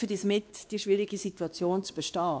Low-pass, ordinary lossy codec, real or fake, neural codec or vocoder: none; none; fake; codec, 16 kHz, 2 kbps, X-Codec, WavLM features, trained on Multilingual LibriSpeech